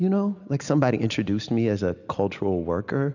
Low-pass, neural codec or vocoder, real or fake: 7.2 kHz; none; real